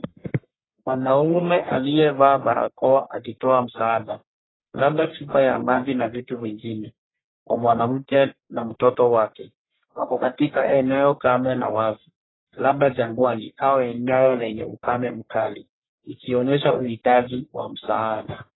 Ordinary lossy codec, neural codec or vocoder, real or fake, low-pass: AAC, 16 kbps; codec, 44.1 kHz, 1.7 kbps, Pupu-Codec; fake; 7.2 kHz